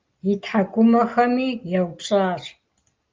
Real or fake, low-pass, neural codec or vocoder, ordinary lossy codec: real; 7.2 kHz; none; Opus, 24 kbps